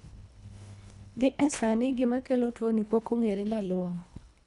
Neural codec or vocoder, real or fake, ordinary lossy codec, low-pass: codec, 24 kHz, 1.5 kbps, HILCodec; fake; none; 10.8 kHz